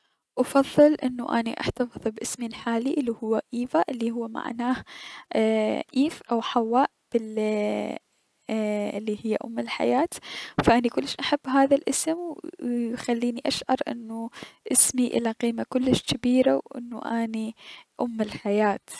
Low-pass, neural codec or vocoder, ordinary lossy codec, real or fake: none; none; none; real